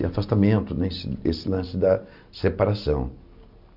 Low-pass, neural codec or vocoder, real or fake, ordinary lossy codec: 5.4 kHz; none; real; none